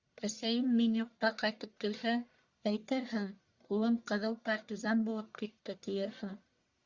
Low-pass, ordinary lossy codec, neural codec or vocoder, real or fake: 7.2 kHz; Opus, 64 kbps; codec, 44.1 kHz, 1.7 kbps, Pupu-Codec; fake